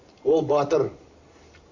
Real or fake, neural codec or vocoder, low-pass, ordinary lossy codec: real; none; 7.2 kHz; Opus, 64 kbps